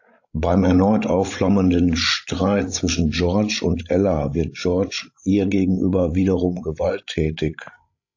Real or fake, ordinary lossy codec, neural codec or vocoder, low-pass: fake; AAC, 48 kbps; codec, 16 kHz, 16 kbps, FreqCodec, larger model; 7.2 kHz